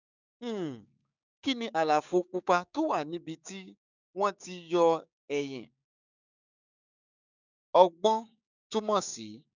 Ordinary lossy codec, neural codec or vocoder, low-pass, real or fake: none; codec, 16 kHz, 6 kbps, DAC; 7.2 kHz; fake